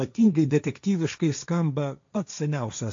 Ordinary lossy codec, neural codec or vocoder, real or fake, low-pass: AAC, 64 kbps; codec, 16 kHz, 1.1 kbps, Voila-Tokenizer; fake; 7.2 kHz